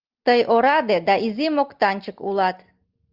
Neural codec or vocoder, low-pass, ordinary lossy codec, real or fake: none; 5.4 kHz; Opus, 32 kbps; real